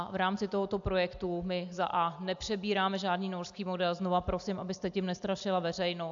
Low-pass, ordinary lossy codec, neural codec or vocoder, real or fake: 7.2 kHz; AAC, 64 kbps; none; real